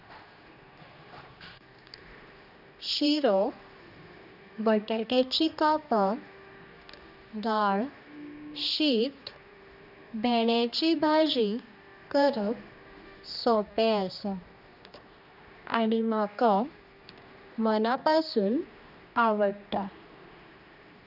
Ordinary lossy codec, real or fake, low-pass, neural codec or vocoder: none; fake; 5.4 kHz; codec, 16 kHz, 2 kbps, X-Codec, HuBERT features, trained on general audio